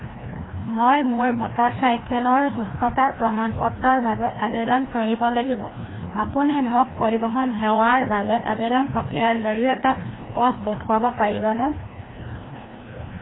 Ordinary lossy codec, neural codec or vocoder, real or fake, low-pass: AAC, 16 kbps; codec, 16 kHz, 1 kbps, FreqCodec, larger model; fake; 7.2 kHz